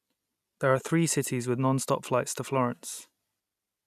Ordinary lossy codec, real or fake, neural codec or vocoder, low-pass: none; real; none; 14.4 kHz